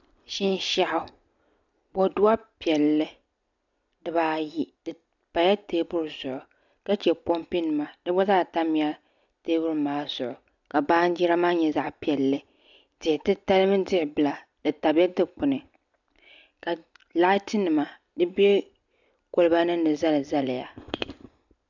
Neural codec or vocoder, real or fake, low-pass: none; real; 7.2 kHz